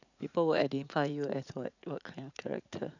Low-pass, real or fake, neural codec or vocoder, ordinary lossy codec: 7.2 kHz; fake; vocoder, 44.1 kHz, 80 mel bands, Vocos; none